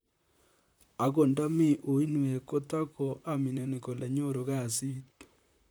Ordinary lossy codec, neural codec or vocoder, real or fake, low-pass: none; vocoder, 44.1 kHz, 128 mel bands, Pupu-Vocoder; fake; none